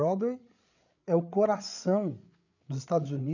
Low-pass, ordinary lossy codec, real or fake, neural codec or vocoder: 7.2 kHz; none; fake; codec, 16 kHz, 8 kbps, FreqCodec, larger model